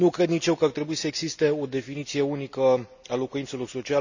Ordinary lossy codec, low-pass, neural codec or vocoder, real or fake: none; 7.2 kHz; none; real